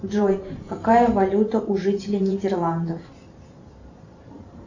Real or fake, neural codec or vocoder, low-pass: fake; vocoder, 44.1 kHz, 128 mel bands every 512 samples, BigVGAN v2; 7.2 kHz